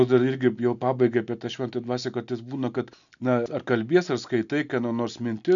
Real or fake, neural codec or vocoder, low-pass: real; none; 7.2 kHz